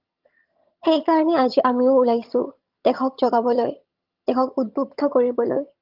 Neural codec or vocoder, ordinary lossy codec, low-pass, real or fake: vocoder, 22.05 kHz, 80 mel bands, HiFi-GAN; Opus, 32 kbps; 5.4 kHz; fake